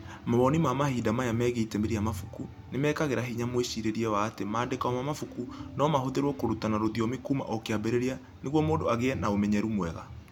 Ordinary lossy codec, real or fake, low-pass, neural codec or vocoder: none; real; 19.8 kHz; none